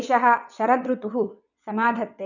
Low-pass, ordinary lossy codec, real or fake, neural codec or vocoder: 7.2 kHz; none; fake; vocoder, 22.05 kHz, 80 mel bands, WaveNeXt